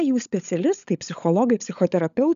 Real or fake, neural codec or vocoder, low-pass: fake; codec, 16 kHz, 16 kbps, FunCodec, trained on LibriTTS, 50 frames a second; 7.2 kHz